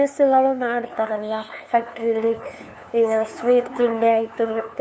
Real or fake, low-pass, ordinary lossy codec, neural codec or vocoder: fake; none; none; codec, 16 kHz, 2 kbps, FunCodec, trained on LibriTTS, 25 frames a second